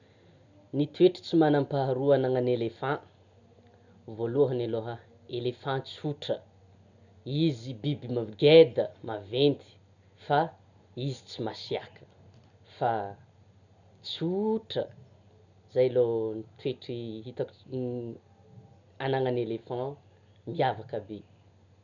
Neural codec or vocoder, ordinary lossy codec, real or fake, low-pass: none; none; real; 7.2 kHz